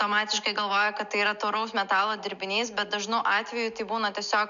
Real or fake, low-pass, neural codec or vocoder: real; 7.2 kHz; none